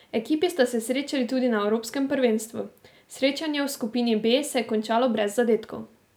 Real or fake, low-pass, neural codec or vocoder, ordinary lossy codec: real; none; none; none